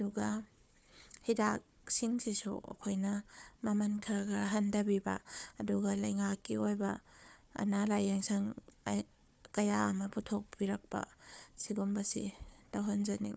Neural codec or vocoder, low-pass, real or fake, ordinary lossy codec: codec, 16 kHz, 4 kbps, FunCodec, trained on Chinese and English, 50 frames a second; none; fake; none